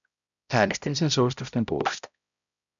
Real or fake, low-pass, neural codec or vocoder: fake; 7.2 kHz; codec, 16 kHz, 0.5 kbps, X-Codec, HuBERT features, trained on balanced general audio